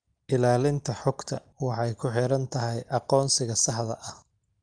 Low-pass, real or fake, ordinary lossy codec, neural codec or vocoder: 9.9 kHz; real; Opus, 32 kbps; none